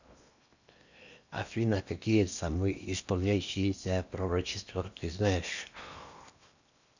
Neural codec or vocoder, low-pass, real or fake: codec, 16 kHz in and 24 kHz out, 0.8 kbps, FocalCodec, streaming, 65536 codes; 7.2 kHz; fake